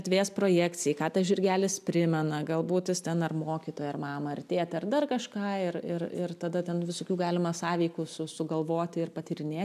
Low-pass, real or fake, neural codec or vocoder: 14.4 kHz; real; none